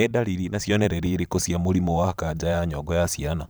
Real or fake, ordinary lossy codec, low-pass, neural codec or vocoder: fake; none; none; vocoder, 44.1 kHz, 128 mel bands every 512 samples, BigVGAN v2